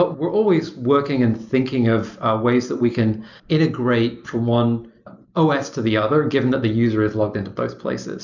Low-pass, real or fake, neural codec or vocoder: 7.2 kHz; real; none